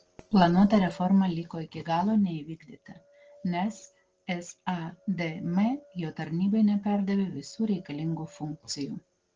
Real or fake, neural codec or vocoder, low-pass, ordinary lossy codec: real; none; 7.2 kHz; Opus, 16 kbps